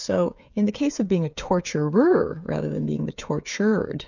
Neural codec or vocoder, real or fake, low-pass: codec, 16 kHz, 8 kbps, FreqCodec, smaller model; fake; 7.2 kHz